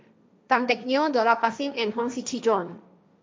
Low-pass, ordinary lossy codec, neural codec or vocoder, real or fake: none; none; codec, 16 kHz, 1.1 kbps, Voila-Tokenizer; fake